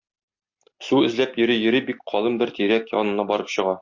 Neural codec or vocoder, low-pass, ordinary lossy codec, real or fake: none; 7.2 kHz; MP3, 48 kbps; real